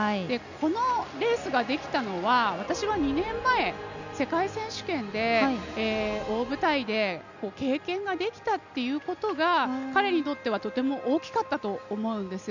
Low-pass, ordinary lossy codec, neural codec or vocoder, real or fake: 7.2 kHz; none; none; real